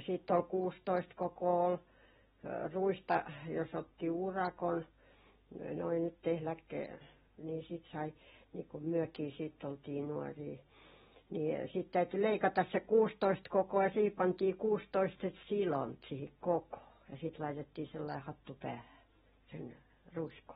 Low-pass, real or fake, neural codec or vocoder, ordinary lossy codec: 19.8 kHz; real; none; AAC, 16 kbps